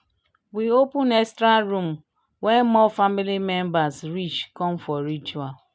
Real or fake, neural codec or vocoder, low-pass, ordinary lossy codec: real; none; none; none